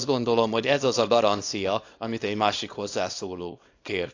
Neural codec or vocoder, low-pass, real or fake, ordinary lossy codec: codec, 24 kHz, 0.9 kbps, WavTokenizer, small release; 7.2 kHz; fake; AAC, 48 kbps